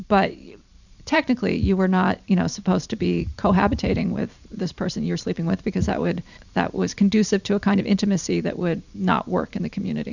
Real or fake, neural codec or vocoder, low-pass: fake; vocoder, 44.1 kHz, 128 mel bands every 512 samples, BigVGAN v2; 7.2 kHz